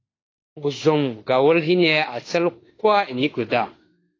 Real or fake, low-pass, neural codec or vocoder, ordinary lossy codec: fake; 7.2 kHz; autoencoder, 48 kHz, 32 numbers a frame, DAC-VAE, trained on Japanese speech; AAC, 32 kbps